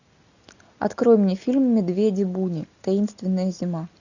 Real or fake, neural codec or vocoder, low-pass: real; none; 7.2 kHz